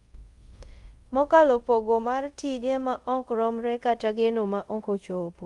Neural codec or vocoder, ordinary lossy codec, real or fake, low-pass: codec, 24 kHz, 0.5 kbps, DualCodec; Opus, 32 kbps; fake; 10.8 kHz